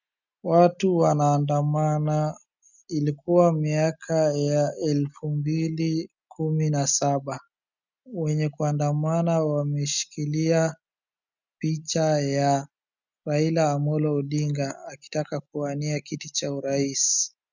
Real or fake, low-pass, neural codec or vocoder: real; 7.2 kHz; none